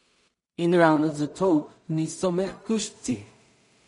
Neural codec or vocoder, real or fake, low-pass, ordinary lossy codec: codec, 16 kHz in and 24 kHz out, 0.4 kbps, LongCat-Audio-Codec, two codebook decoder; fake; 10.8 kHz; MP3, 48 kbps